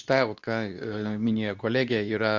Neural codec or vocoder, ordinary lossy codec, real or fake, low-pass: codec, 24 kHz, 0.9 kbps, WavTokenizer, medium speech release version 2; Opus, 64 kbps; fake; 7.2 kHz